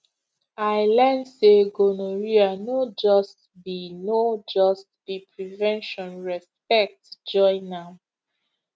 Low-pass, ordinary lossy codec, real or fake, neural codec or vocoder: none; none; real; none